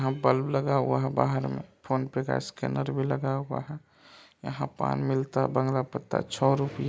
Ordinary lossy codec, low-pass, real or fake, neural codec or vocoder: none; none; real; none